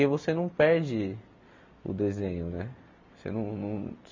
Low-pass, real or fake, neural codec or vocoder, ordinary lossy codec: 7.2 kHz; real; none; MP3, 48 kbps